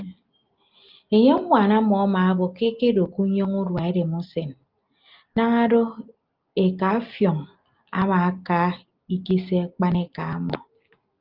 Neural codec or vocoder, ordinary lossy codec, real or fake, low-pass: none; Opus, 24 kbps; real; 5.4 kHz